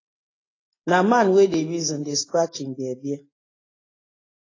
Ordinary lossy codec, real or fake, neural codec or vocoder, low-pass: AAC, 32 kbps; real; none; 7.2 kHz